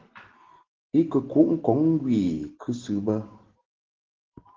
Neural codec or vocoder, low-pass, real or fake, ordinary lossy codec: none; 7.2 kHz; real; Opus, 16 kbps